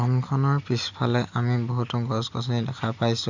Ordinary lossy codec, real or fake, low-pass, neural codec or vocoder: none; real; 7.2 kHz; none